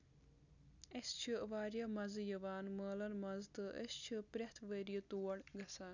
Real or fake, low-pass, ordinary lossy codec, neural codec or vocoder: real; 7.2 kHz; none; none